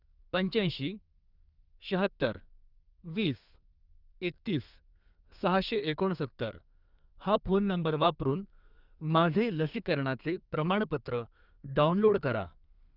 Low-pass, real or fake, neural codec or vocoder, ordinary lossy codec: 5.4 kHz; fake; codec, 32 kHz, 1.9 kbps, SNAC; Opus, 64 kbps